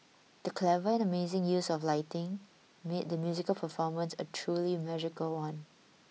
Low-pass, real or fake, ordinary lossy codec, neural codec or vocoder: none; real; none; none